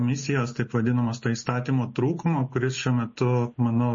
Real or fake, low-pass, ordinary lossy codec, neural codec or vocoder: real; 7.2 kHz; MP3, 32 kbps; none